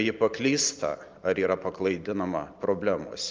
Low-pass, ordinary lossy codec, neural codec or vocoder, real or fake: 7.2 kHz; Opus, 24 kbps; none; real